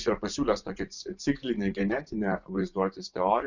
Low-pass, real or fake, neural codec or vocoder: 7.2 kHz; fake; vocoder, 24 kHz, 100 mel bands, Vocos